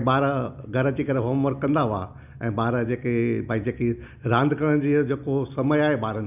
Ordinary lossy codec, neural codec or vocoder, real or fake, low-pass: none; none; real; 3.6 kHz